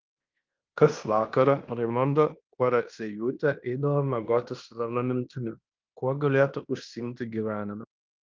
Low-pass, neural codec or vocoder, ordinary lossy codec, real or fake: 7.2 kHz; codec, 16 kHz in and 24 kHz out, 0.9 kbps, LongCat-Audio-Codec, fine tuned four codebook decoder; Opus, 24 kbps; fake